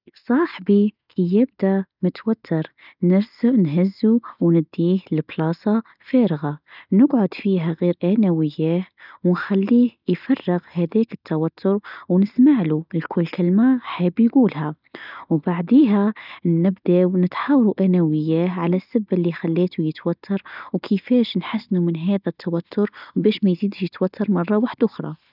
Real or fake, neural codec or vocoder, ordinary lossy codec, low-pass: real; none; none; 5.4 kHz